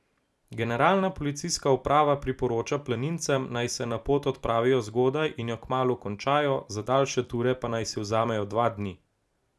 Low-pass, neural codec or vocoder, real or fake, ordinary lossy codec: none; none; real; none